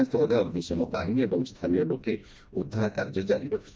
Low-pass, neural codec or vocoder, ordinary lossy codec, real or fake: none; codec, 16 kHz, 1 kbps, FreqCodec, smaller model; none; fake